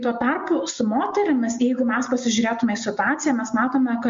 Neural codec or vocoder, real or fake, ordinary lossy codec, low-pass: none; real; MP3, 48 kbps; 7.2 kHz